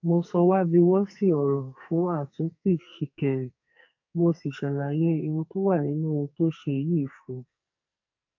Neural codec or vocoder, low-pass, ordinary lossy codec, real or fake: codec, 44.1 kHz, 2.6 kbps, SNAC; 7.2 kHz; AAC, 48 kbps; fake